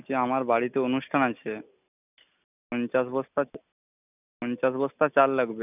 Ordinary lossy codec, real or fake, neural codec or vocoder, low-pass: none; real; none; 3.6 kHz